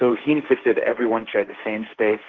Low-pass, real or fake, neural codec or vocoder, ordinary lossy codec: 7.2 kHz; fake; codec, 16 kHz, 1.1 kbps, Voila-Tokenizer; Opus, 16 kbps